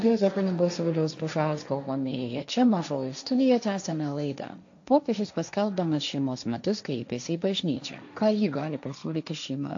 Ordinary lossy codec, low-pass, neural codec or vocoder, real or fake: AAC, 64 kbps; 7.2 kHz; codec, 16 kHz, 1.1 kbps, Voila-Tokenizer; fake